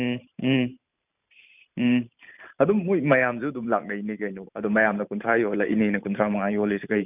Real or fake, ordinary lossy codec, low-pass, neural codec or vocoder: fake; none; 3.6 kHz; vocoder, 44.1 kHz, 128 mel bands every 512 samples, BigVGAN v2